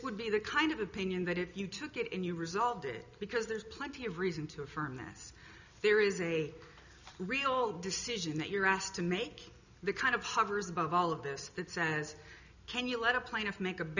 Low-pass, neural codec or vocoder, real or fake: 7.2 kHz; none; real